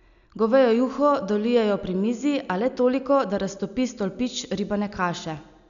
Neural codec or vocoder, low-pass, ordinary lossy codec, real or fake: none; 7.2 kHz; MP3, 96 kbps; real